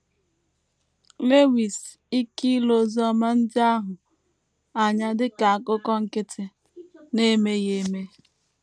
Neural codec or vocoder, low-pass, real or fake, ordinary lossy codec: none; none; real; none